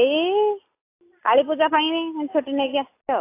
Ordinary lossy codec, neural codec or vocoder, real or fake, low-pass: AAC, 24 kbps; none; real; 3.6 kHz